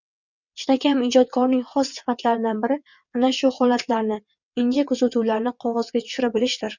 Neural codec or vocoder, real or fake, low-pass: vocoder, 22.05 kHz, 80 mel bands, WaveNeXt; fake; 7.2 kHz